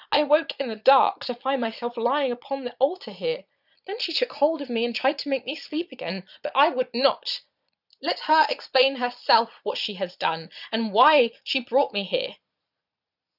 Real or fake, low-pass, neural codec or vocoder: fake; 5.4 kHz; vocoder, 22.05 kHz, 80 mel bands, Vocos